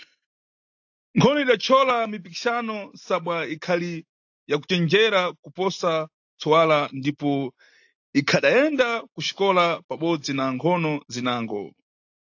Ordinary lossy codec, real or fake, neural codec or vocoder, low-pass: AAC, 48 kbps; real; none; 7.2 kHz